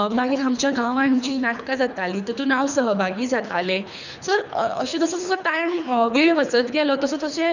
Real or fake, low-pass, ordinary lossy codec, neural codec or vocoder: fake; 7.2 kHz; none; codec, 24 kHz, 3 kbps, HILCodec